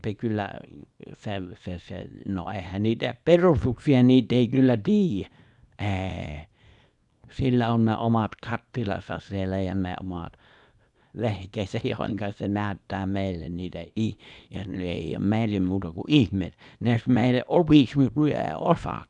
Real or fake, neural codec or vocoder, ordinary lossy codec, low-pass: fake; codec, 24 kHz, 0.9 kbps, WavTokenizer, small release; none; 10.8 kHz